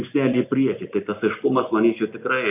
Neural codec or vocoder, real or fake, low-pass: none; real; 3.6 kHz